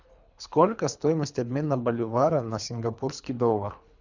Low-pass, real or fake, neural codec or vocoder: 7.2 kHz; fake; codec, 24 kHz, 3 kbps, HILCodec